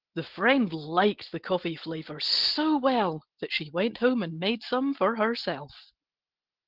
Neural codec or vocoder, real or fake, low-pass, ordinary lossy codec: none; real; 5.4 kHz; Opus, 16 kbps